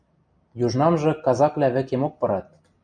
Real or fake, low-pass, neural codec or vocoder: real; 9.9 kHz; none